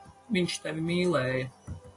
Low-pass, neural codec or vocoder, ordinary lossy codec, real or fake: 10.8 kHz; none; AAC, 48 kbps; real